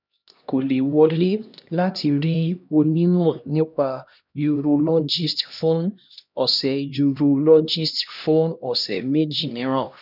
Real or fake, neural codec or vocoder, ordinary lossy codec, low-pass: fake; codec, 16 kHz, 1 kbps, X-Codec, HuBERT features, trained on LibriSpeech; none; 5.4 kHz